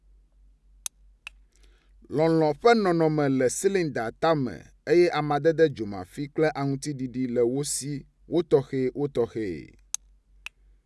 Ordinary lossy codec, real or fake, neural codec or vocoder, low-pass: none; real; none; none